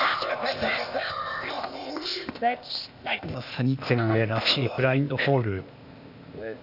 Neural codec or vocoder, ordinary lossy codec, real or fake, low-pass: codec, 16 kHz, 0.8 kbps, ZipCodec; none; fake; 5.4 kHz